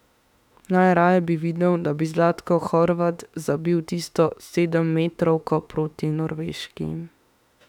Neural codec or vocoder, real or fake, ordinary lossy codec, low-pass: autoencoder, 48 kHz, 32 numbers a frame, DAC-VAE, trained on Japanese speech; fake; none; 19.8 kHz